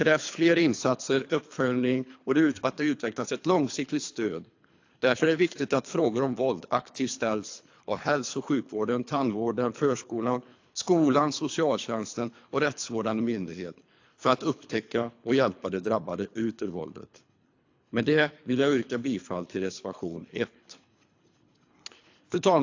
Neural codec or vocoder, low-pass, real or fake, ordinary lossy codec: codec, 24 kHz, 3 kbps, HILCodec; 7.2 kHz; fake; AAC, 48 kbps